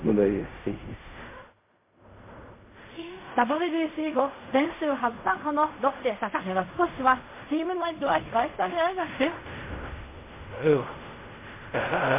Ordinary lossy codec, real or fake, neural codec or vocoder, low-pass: MP3, 24 kbps; fake; codec, 16 kHz in and 24 kHz out, 0.4 kbps, LongCat-Audio-Codec, fine tuned four codebook decoder; 3.6 kHz